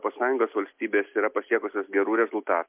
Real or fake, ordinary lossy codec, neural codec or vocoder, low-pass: real; MP3, 32 kbps; none; 3.6 kHz